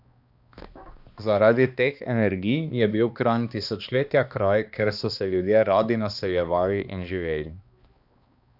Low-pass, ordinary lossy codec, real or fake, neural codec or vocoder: 5.4 kHz; Opus, 64 kbps; fake; codec, 16 kHz, 2 kbps, X-Codec, HuBERT features, trained on balanced general audio